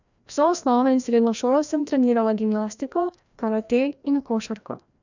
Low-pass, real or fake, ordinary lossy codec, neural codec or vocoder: 7.2 kHz; fake; none; codec, 16 kHz, 1 kbps, FreqCodec, larger model